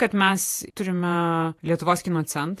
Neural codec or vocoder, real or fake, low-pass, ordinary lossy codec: vocoder, 48 kHz, 128 mel bands, Vocos; fake; 14.4 kHz; AAC, 64 kbps